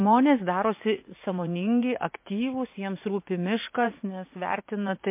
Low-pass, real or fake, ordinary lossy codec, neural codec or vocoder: 3.6 kHz; fake; MP3, 24 kbps; vocoder, 44.1 kHz, 80 mel bands, Vocos